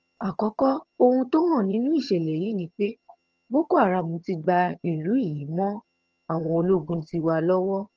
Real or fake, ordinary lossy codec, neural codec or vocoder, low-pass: fake; Opus, 24 kbps; vocoder, 22.05 kHz, 80 mel bands, HiFi-GAN; 7.2 kHz